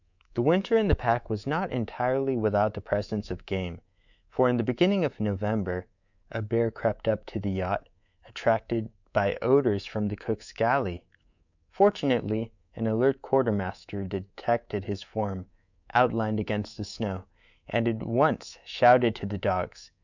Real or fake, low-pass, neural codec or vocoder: fake; 7.2 kHz; codec, 24 kHz, 3.1 kbps, DualCodec